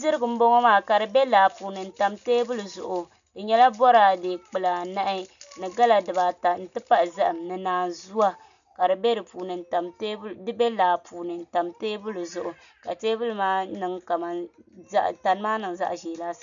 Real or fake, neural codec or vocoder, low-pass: real; none; 7.2 kHz